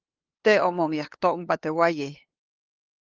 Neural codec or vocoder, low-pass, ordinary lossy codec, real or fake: codec, 16 kHz, 2 kbps, FunCodec, trained on LibriTTS, 25 frames a second; 7.2 kHz; Opus, 32 kbps; fake